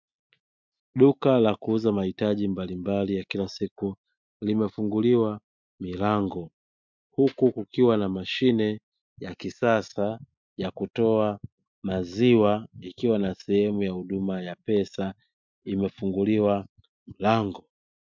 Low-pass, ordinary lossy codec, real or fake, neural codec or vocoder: 7.2 kHz; MP3, 64 kbps; real; none